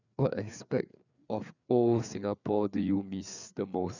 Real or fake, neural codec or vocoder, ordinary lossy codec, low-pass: fake; codec, 16 kHz, 4 kbps, FreqCodec, larger model; none; 7.2 kHz